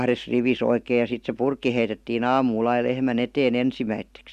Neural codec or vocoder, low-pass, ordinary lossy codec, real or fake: none; 14.4 kHz; Opus, 64 kbps; real